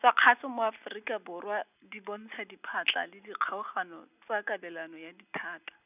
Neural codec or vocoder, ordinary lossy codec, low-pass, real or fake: none; none; 3.6 kHz; real